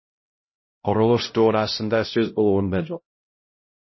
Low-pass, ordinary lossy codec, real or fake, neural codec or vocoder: 7.2 kHz; MP3, 24 kbps; fake; codec, 16 kHz, 0.5 kbps, X-Codec, HuBERT features, trained on LibriSpeech